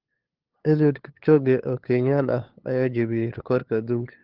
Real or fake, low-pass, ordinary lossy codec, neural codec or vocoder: fake; 5.4 kHz; Opus, 16 kbps; codec, 16 kHz, 2 kbps, FunCodec, trained on LibriTTS, 25 frames a second